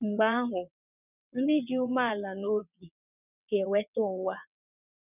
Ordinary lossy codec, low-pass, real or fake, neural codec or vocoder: none; 3.6 kHz; fake; vocoder, 22.05 kHz, 80 mel bands, WaveNeXt